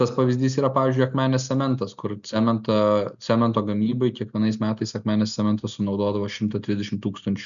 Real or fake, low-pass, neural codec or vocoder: real; 7.2 kHz; none